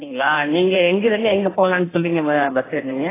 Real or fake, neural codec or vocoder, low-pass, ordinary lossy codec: fake; codec, 16 kHz in and 24 kHz out, 1.1 kbps, FireRedTTS-2 codec; 3.6 kHz; AAC, 16 kbps